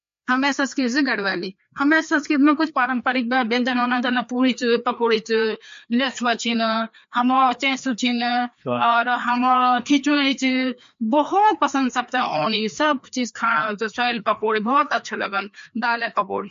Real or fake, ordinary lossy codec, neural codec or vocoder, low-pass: fake; MP3, 48 kbps; codec, 16 kHz, 2 kbps, FreqCodec, larger model; 7.2 kHz